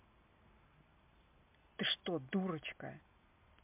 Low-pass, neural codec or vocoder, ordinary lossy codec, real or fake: 3.6 kHz; none; MP3, 32 kbps; real